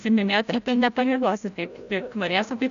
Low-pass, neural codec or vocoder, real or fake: 7.2 kHz; codec, 16 kHz, 0.5 kbps, FreqCodec, larger model; fake